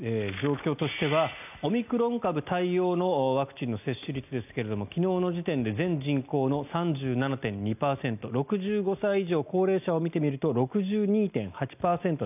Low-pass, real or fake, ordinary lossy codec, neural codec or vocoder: 3.6 kHz; real; none; none